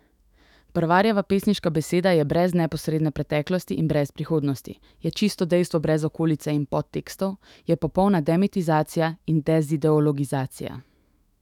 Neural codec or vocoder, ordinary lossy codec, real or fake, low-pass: autoencoder, 48 kHz, 128 numbers a frame, DAC-VAE, trained on Japanese speech; none; fake; 19.8 kHz